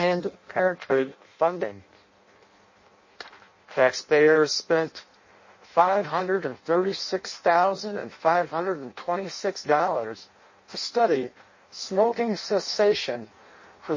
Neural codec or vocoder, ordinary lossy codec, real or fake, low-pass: codec, 16 kHz in and 24 kHz out, 0.6 kbps, FireRedTTS-2 codec; MP3, 32 kbps; fake; 7.2 kHz